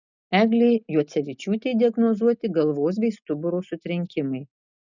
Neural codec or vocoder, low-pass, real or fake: none; 7.2 kHz; real